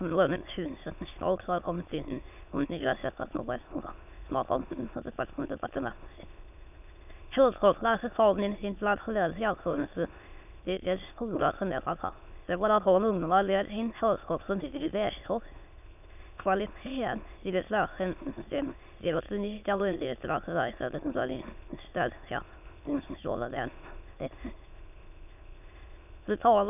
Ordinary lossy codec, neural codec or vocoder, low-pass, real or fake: none; autoencoder, 22.05 kHz, a latent of 192 numbers a frame, VITS, trained on many speakers; 3.6 kHz; fake